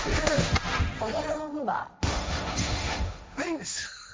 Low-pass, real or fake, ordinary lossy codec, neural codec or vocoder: none; fake; none; codec, 16 kHz, 1.1 kbps, Voila-Tokenizer